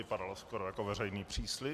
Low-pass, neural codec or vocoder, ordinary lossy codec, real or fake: 14.4 kHz; none; MP3, 96 kbps; real